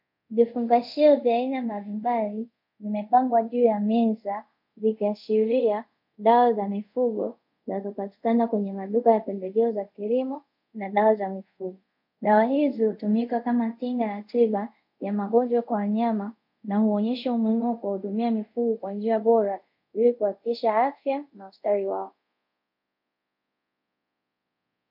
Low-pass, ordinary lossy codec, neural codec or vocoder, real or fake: 5.4 kHz; MP3, 48 kbps; codec, 24 kHz, 0.5 kbps, DualCodec; fake